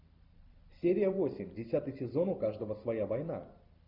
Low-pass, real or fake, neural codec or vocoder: 5.4 kHz; real; none